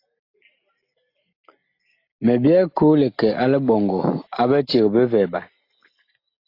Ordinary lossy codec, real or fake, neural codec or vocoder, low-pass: AAC, 32 kbps; real; none; 5.4 kHz